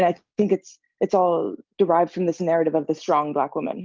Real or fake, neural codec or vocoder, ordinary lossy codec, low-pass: real; none; Opus, 32 kbps; 7.2 kHz